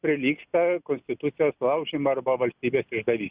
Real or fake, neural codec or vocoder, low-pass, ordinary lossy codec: real; none; 3.6 kHz; Opus, 64 kbps